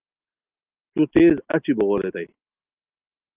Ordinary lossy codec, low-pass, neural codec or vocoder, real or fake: Opus, 32 kbps; 3.6 kHz; none; real